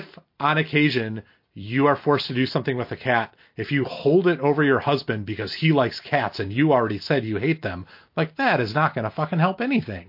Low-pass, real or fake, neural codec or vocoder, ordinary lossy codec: 5.4 kHz; real; none; MP3, 32 kbps